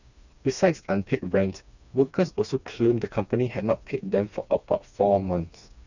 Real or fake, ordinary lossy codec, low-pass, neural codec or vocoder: fake; none; 7.2 kHz; codec, 16 kHz, 2 kbps, FreqCodec, smaller model